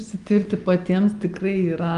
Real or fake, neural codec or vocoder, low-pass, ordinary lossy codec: real; none; 10.8 kHz; Opus, 32 kbps